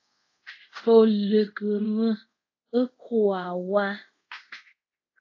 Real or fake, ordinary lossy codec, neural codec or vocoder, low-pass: fake; AAC, 48 kbps; codec, 24 kHz, 0.5 kbps, DualCodec; 7.2 kHz